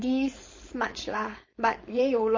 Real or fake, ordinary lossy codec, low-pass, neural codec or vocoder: fake; MP3, 32 kbps; 7.2 kHz; codec, 16 kHz, 4.8 kbps, FACodec